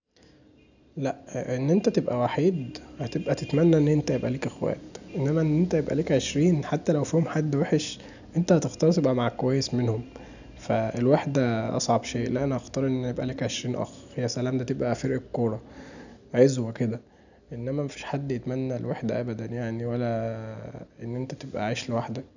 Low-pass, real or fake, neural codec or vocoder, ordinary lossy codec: 7.2 kHz; real; none; none